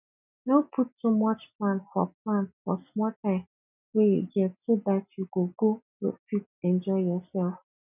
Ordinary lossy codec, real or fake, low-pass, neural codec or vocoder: none; real; 3.6 kHz; none